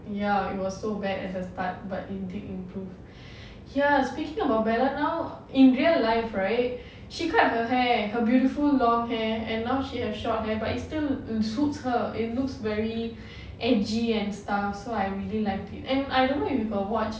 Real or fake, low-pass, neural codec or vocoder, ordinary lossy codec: real; none; none; none